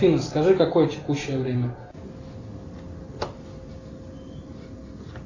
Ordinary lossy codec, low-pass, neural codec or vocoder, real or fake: AAC, 32 kbps; 7.2 kHz; none; real